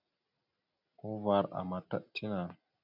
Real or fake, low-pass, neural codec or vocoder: real; 5.4 kHz; none